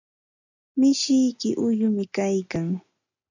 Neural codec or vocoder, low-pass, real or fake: none; 7.2 kHz; real